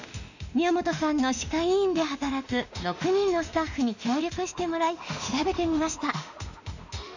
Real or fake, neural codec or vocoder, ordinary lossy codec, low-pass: fake; autoencoder, 48 kHz, 32 numbers a frame, DAC-VAE, trained on Japanese speech; none; 7.2 kHz